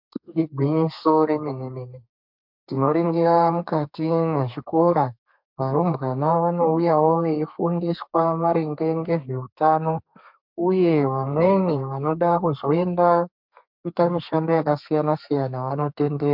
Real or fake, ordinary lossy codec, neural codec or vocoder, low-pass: fake; MP3, 48 kbps; codec, 32 kHz, 1.9 kbps, SNAC; 5.4 kHz